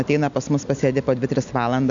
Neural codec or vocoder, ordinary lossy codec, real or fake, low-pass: none; MP3, 64 kbps; real; 7.2 kHz